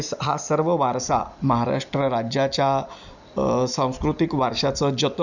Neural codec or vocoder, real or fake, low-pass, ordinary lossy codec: none; real; 7.2 kHz; none